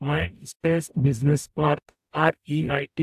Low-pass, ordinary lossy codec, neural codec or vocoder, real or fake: 14.4 kHz; AAC, 96 kbps; codec, 44.1 kHz, 0.9 kbps, DAC; fake